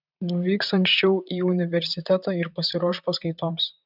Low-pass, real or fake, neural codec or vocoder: 5.4 kHz; fake; vocoder, 44.1 kHz, 128 mel bands, Pupu-Vocoder